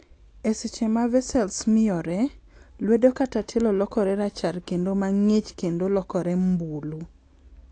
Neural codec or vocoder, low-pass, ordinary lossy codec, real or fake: none; 9.9 kHz; AAC, 48 kbps; real